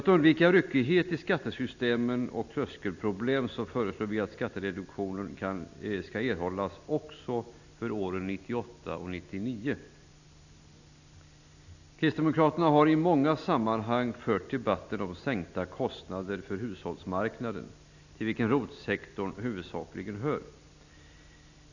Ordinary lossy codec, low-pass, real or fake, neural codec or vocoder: none; 7.2 kHz; real; none